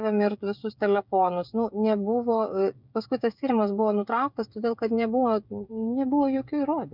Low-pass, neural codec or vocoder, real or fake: 5.4 kHz; codec, 16 kHz, 16 kbps, FreqCodec, smaller model; fake